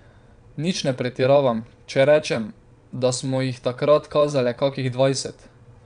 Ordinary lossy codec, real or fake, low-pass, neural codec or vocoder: none; fake; 9.9 kHz; vocoder, 22.05 kHz, 80 mel bands, Vocos